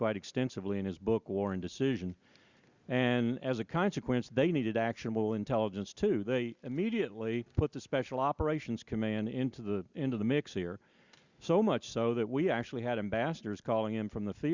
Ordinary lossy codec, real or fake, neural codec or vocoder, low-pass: Opus, 64 kbps; real; none; 7.2 kHz